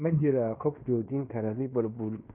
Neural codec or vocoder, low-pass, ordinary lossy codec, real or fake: codec, 16 kHz in and 24 kHz out, 0.9 kbps, LongCat-Audio-Codec, fine tuned four codebook decoder; 3.6 kHz; none; fake